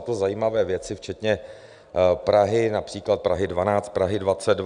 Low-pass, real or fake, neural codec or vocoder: 9.9 kHz; real; none